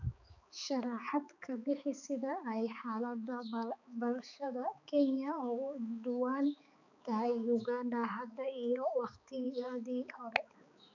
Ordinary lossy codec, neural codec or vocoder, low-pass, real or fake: none; codec, 16 kHz, 4 kbps, X-Codec, HuBERT features, trained on balanced general audio; 7.2 kHz; fake